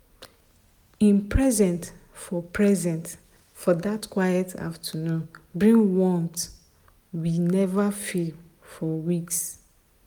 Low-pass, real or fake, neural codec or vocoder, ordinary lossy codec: none; real; none; none